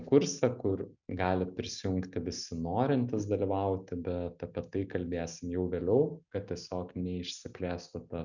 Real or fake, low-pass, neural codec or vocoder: real; 7.2 kHz; none